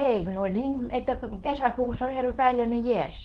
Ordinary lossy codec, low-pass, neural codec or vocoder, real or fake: Opus, 16 kbps; 10.8 kHz; codec, 24 kHz, 0.9 kbps, WavTokenizer, small release; fake